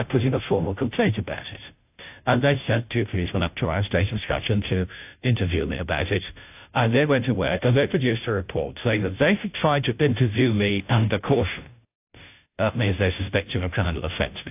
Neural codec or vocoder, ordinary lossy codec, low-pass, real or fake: codec, 16 kHz, 0.5 kbps, FunCodec, trained on Chinese and English, 25 frames a second; AAC, 32 kbps; 3.6 kHz; fake